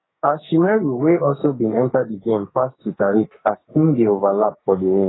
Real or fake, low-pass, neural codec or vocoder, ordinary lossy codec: fake; 7.2 kHz; codec, 44.1 kHz, 2.6 kbps, SNAC; AAC, 16 kbps